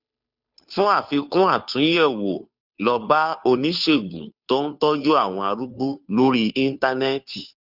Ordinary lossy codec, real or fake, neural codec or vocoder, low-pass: none; fake; codec, 16 kHz, 2 kbps, FunCodec, trained on Chinese and English, 25 frames a second; 5.4 kHz